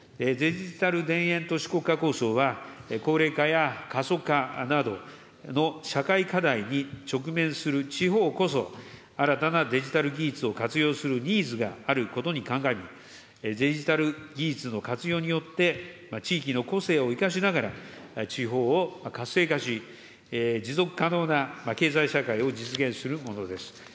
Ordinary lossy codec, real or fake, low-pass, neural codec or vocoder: none; real; none; none